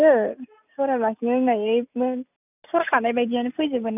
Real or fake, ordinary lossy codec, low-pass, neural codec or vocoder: real; none; 3.6 kHz; none